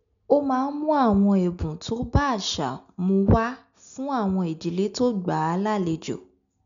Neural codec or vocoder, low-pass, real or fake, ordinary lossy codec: none; 7.2 kHz; real; none